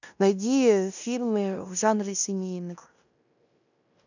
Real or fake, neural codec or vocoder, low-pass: fake; codec, 16 kHz in and 24 kHz out, 0.9 kbps, LongCat-Audio-Codec, fine tuned four codebook decoder; 7.2 kHz